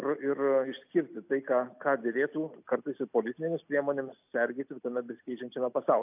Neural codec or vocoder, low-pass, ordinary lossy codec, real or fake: none; 3.6 kHz; AAC, 32 kbps; real